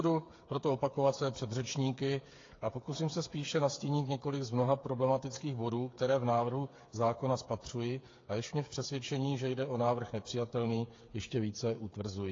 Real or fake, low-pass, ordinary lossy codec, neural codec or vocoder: fake; 7.2 kHz; AAC, 32 kbps; codec, 16 kHz, 8 kbps, FreqCodec, smaller model